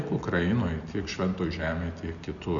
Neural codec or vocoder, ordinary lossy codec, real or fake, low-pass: none; MP3, 96 kbps; real; 7.2 kHz